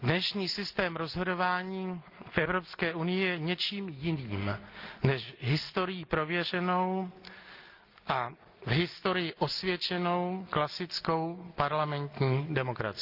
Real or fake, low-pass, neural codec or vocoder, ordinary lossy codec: real; 5.4 kHz; none; Opus, 32 kbps